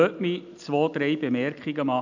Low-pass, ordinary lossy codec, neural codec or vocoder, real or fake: 7.2 kHz; none; none; real